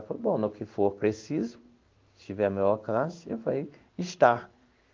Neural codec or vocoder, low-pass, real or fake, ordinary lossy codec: codec, 16 kHz in and 24 kHz out, 1 kbps, XY-Tokenizer; 7.2 kHz; fake; Opus, 32 kbps